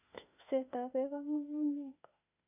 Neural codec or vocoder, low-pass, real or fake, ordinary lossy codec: autoencoder, 48 kHz, 32 numbers a frame, DAC-VAE, trained on Japanese speech; 3.6 kHz; fake; none